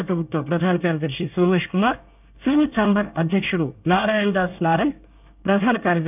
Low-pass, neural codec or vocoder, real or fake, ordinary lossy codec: 3.6 kHz; codec, 24 kHz, 1 kbps, SNAC; fake; none